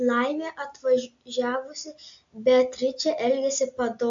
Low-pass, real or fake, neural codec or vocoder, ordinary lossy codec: 7.2 kHz; real; none; AAC, 64 kbps